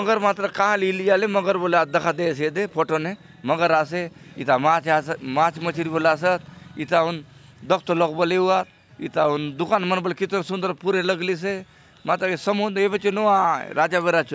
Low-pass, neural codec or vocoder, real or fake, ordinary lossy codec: none; none; real; none